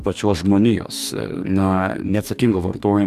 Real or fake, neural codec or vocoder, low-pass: fake; codec, 44.1 kHz, 2.6 kbps, DAC; 14.4 kHz